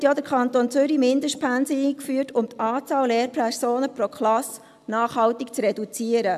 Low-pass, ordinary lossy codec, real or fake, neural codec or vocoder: 14.4 kHz; AAC, 96 kbps; real; none